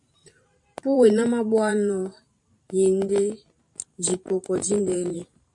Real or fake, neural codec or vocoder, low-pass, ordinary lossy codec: fake; vocoder, 44.1 kHz, 128 mel bands every 512 samples, BigVGAN v2; 10.8 kHz; AAC, 64 kbps